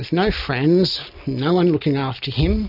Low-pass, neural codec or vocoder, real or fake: 5.4 kHz; none; real